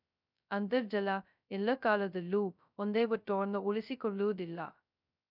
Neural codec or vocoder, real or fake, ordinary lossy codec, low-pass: codec, 16 kHz, 0.2 kbps, FocalCodec; fake; none; 5.4 kHz